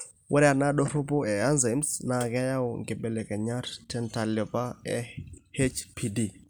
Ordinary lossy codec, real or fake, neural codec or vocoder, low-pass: none; real; none; none